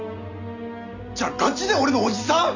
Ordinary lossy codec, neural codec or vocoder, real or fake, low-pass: none; none; real; 7.2 kHz